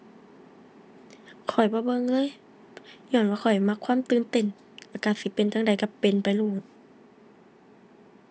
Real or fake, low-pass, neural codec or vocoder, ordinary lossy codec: real; none; none; none